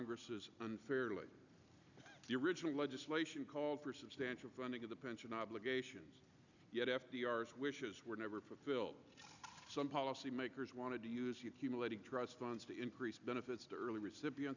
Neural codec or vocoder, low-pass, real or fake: none; 7.2 kHz; real